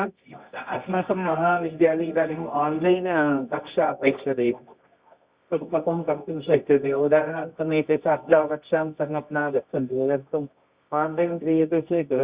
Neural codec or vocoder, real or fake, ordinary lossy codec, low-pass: codec, 24 kHz, 0.9 kbps, WavTokenizer, medium music audio release; fake; Opus, 64 kbps; 3.6 kHz